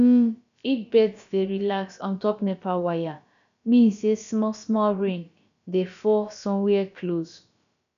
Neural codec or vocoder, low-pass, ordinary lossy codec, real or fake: codec, 16 kHz, about 1 kbps, DyCAST, with the encoder's durations; 7.2 kHz; none; fake